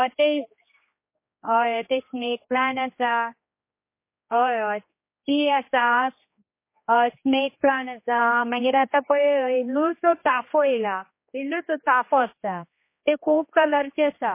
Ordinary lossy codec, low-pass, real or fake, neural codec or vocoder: MP3, 24 kbps; 3.6 kHz; fake; codec, 16 kHz, 2 kbps, X-Codec, HuBERT features, trained on general audio